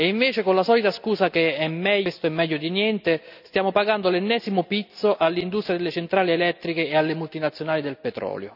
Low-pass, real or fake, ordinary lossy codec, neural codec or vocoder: 5.4 kHz; real; none; none